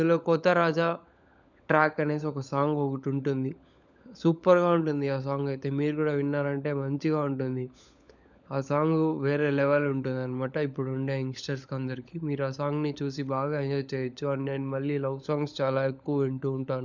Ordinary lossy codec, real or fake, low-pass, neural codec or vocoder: none; fake; 7.2 kHz; codec, 16 kHz, 16 kbps, FunCodec, trained on LibriTTS, 50 frames a second